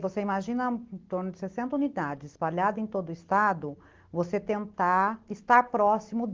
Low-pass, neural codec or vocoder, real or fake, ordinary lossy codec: 7.2 kHz; none; real; Opus, 16 kbps